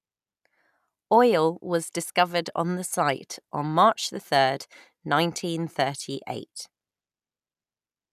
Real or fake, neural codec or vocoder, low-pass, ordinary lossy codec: real; none; 14.4 kHz; none